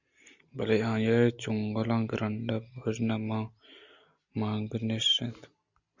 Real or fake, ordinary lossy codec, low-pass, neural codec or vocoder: real; Opus, 64 kbps; 7.2 kHz; none